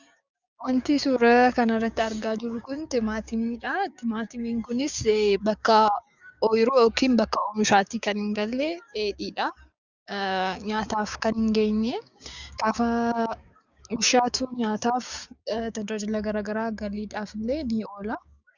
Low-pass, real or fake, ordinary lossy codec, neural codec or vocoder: 7.2 kHz; fake; Opus, 64 kbps; codec, 16 kHz, 6 kbps, DAC